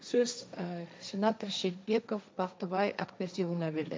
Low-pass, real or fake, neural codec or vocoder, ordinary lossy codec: none; fake; codec, 16 kHz, 1.1 kbps, Voila-Tokenizer; none